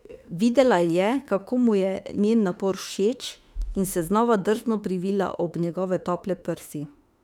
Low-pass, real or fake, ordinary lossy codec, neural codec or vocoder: 19.8 kHz; fake; none; autoencoder, 48 kHz, 32 numbers a frame, DAC-VAE, trained on Japanese speech